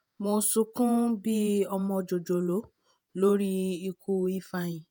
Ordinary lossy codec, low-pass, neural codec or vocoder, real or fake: none; none; vocoder, 48 kHz, 128 mel bands, Vocos; fake